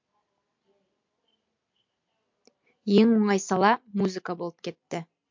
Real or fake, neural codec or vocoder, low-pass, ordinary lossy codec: real; none; 7.2 kHz; MP3, 48 kbps